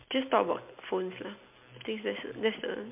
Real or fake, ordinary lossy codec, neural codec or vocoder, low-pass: real; MP3, 32 kbps; none; 3.6 kHz